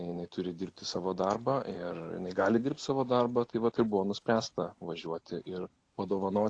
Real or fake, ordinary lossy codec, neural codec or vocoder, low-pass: real; AAC, 48 kbps; none; 9.9 kHz